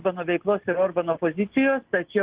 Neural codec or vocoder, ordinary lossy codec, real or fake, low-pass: none; Opus, 16 kbps; real; 3.6 kHz